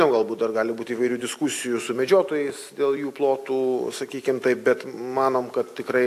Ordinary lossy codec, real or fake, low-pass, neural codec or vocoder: AAC, 64 kbps; real; 14.4 kHz; none